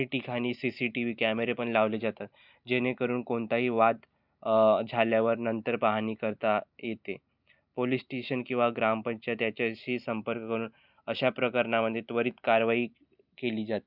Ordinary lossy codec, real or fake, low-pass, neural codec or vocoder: none; real; 5.4 kHz; none